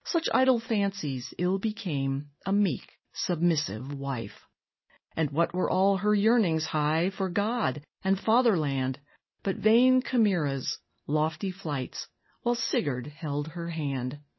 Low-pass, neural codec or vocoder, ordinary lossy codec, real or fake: 7.2 kHz; none; MP3, 24 kbps; real